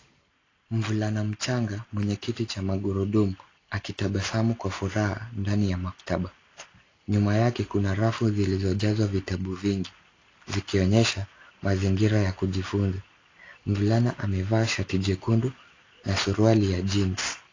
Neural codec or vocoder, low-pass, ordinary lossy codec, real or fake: none; 7.2 kHz; AAC, 32 kbps; real